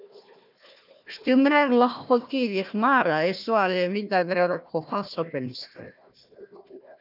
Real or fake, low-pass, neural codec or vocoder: fake; 5.4 kHz; codec, 16 kHz, 1 kbps, FunCodec, trained on Chinese and English, 50 frames a second